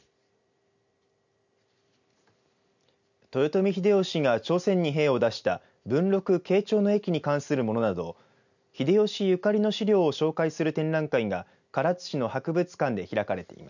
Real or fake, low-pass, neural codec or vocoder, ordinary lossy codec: real; 7.2 kHz; none; none